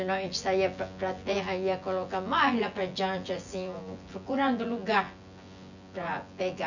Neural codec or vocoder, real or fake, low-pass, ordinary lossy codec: vocoder, 24 kHz, 100 mel bands, Vocos; fake; 7.2 kHz; none